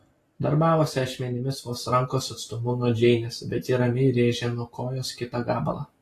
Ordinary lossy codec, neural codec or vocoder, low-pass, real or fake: AAC, 48 kbps; none; 14.4 kHz; real